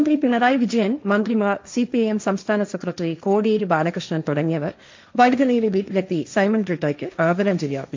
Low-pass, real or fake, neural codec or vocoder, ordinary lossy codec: 7.2 kHz; fake; codec, 16 kHz, 1.1 kbps, Voila-Tokenizer; MP3, 64 kbps